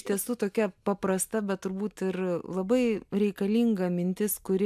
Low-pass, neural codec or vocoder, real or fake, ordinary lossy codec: 14.4 kHz; none; real; AAC, 96 kbps